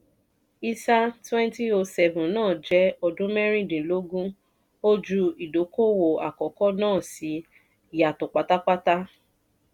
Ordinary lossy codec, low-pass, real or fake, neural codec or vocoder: none; 19.8 kHz; real; none